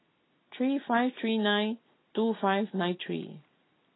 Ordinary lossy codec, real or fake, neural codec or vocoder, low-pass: AAC, 16 kbps; real; none; 7.2 kHz